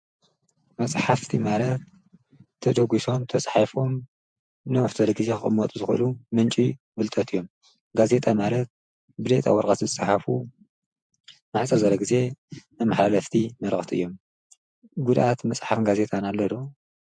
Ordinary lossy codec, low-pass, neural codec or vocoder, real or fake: MP3, 64 kbps; 9.9 kHz; vocoder, 48 kHz, 128 mel bands, Vocos; fake